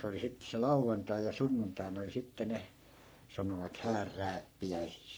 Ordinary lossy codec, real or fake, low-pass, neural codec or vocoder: none; fake; none; codec, 44.1 kHz, 3.4 kbps, Pupu-Codec